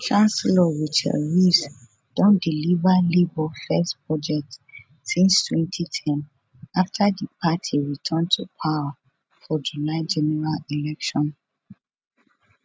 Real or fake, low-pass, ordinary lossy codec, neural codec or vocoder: real; none; none; none